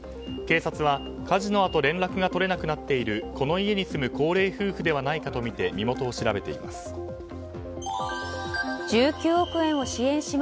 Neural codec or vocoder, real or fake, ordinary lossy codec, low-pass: none; real; none; none